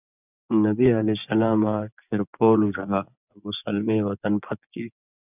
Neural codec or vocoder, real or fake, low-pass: vocoder, 24 kHz, 100 mel bands, Vocos; fake; 3.6 kHz